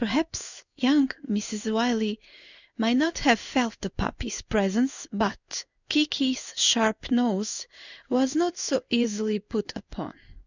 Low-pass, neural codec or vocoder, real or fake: 7.2 kHz; codec, 16 kHz in and 24 kHz out, 1 kbps, XY-Tokenizer; fake